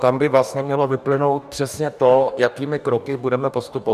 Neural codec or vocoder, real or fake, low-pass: codec, 44.1 kHz, 2.6 kbps, DAC; fake; 14.4 kHz